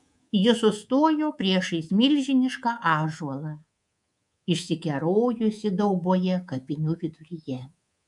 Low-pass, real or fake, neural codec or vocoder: 10.8 kHz; fake; codec, 24 kHz, 3.1 kbps, DualCodec